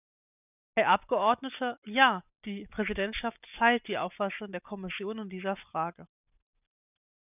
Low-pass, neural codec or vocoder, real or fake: 3.6 kHz; none; real